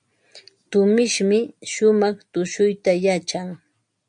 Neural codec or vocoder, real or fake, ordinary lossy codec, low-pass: none; real; MP3, 64 kbps; 9.9 kHz